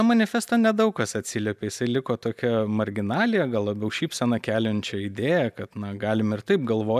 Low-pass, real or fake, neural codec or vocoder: 14.4 kHz; real; none